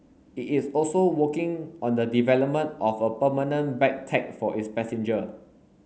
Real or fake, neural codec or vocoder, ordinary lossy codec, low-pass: real; none; none; none